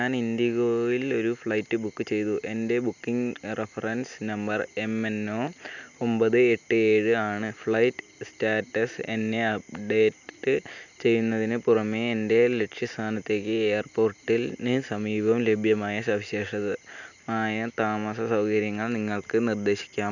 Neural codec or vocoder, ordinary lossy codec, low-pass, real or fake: none; none; 7.2 kHz; real